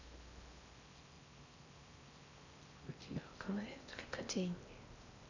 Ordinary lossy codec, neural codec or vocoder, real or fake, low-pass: AAC, 48 kbps; codec, 16 kHz in and 24 kHz out, 0.6 kbps, FocalCodec, streaming, 4096 codes; fake; 7.2 kHz